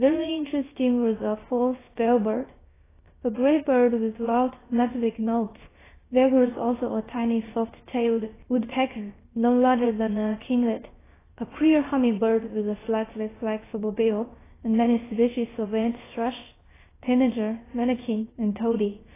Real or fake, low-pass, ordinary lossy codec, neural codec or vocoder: fake; 3.6 kHz; AAC, 16 kbps; codec, 16 kHz, 0.7 kbps, FocalCodec